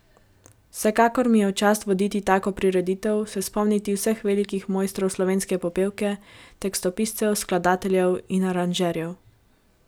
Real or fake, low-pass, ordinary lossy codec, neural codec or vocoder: real; none; none; none